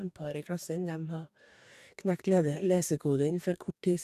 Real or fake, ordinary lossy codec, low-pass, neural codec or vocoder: fake; none; 14.4 kHz; codec, 44.1 kHz, 2.6 kbps, DAC